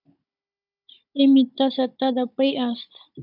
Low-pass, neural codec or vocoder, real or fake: 5.4 kHz; codec, 16 kHz, 16 kbps, FunCodec, trained on Chinese and English, 50 frames a second; fake